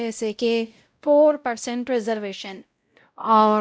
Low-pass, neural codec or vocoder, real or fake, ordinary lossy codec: none; codec, 16 kHz, 0.5 kbps, X-Codec, WavLM features, trained on Multilingual LibriSpeech; fake; none